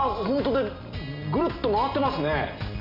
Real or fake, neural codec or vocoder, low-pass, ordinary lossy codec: real; none; 5.4 kHz; none